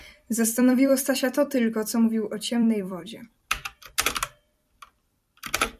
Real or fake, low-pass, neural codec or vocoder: fake; 14.4 kHz; vocoder, 44.1 kHz, 128 mel bands every 256 samples, BigVGAN v2